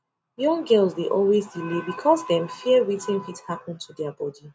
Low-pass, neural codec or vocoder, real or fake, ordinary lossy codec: none; none; real; none